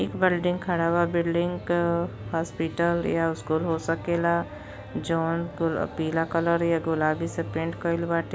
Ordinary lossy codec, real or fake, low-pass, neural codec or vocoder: none; real; none; none